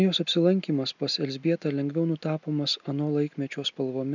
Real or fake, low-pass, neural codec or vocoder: real; 7.2 kHz; none